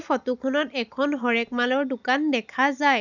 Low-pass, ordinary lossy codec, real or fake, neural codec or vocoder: 7.2 kHz; none; real; none